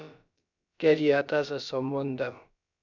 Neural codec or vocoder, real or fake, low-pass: codec, 16 kHz, about 1 kbps, DyCAST, with the encoder's durations; fake; 7.2 kHz